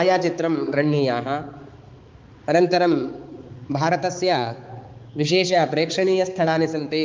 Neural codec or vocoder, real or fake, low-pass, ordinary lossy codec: codec, 16 kHz, 4 kbps, X-Codec, HuBERT features, trained on balanced general audio; fake; 7.2 kHz; Opus, 32 kbps